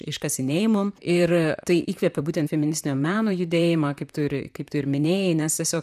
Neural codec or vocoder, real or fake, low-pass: vocoder, 44.1 kHz, 128 mel bands, Pupu-Vocoder; fake; 14.4 kHz